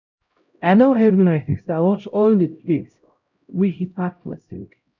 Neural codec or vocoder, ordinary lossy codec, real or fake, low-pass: codec, 16 kHz, 0.5 kbps, X-Codec, HuBERT features, trained on LibriSpeech; none; fake; 7.2 kHz